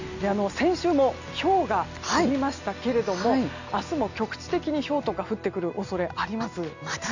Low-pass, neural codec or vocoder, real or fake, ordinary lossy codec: 7.2 kHz; none; real; none